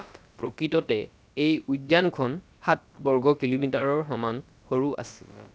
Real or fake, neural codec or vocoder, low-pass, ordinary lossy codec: fake; codec, 16 kHz, about 1 kbps, DyCAST, with the encoder's durations; none; none